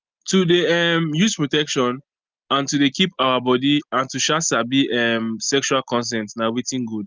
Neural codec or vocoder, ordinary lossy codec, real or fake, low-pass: none; Opus, 32 kbps; real; 7.2 kHz